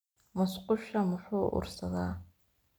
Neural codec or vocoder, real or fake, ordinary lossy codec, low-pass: none; real; none; none